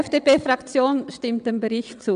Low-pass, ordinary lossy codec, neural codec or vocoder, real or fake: 9.9 kHz; none; vocoder, 22.05 kHz, 80 mel bands, WaveNeXt; fake